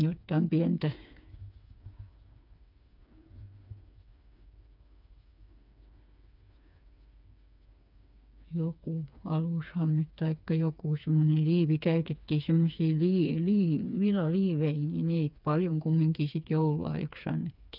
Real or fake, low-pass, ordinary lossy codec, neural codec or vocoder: fake; 5.4 kHz; none; codec, 16 kHz, 4 kbps, FreqCodec, smaller model